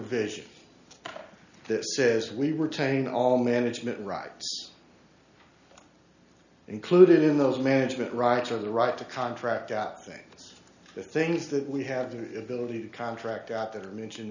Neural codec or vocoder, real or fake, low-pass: none; real; 7.2 kHz